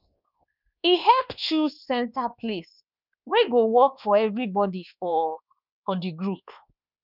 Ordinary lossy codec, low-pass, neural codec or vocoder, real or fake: none; 5.4 kHz; codec, 24 kHz, 1.2 kbps, DualCodec; fake